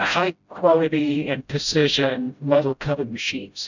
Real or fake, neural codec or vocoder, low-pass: fake; codec, 16 kHz, 0.5 kbps, FreqCodec, smaller model; 7.2 kHz